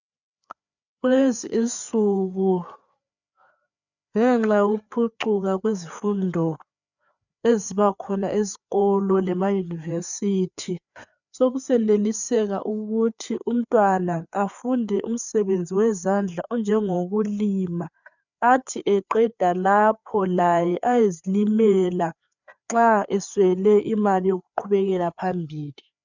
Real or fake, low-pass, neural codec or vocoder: fake; 7.2 kHz; codec, 16 kHz, 4 kbps, FreqCodec, larger model